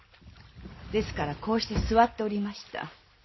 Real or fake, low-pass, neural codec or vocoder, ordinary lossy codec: real; 7.2 kHz; none; MP3, 24 kbps